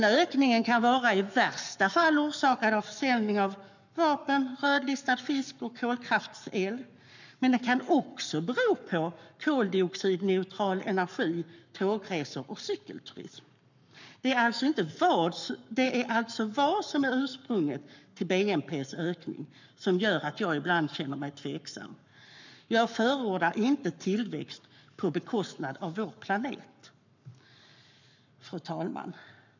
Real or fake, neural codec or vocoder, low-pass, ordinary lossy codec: fake; codec, 44.1 kHz, 7.8 kbps, Pupu-Codec; 7.2 kHz; none